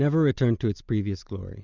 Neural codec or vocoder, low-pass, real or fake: none; 7.2 kHz; real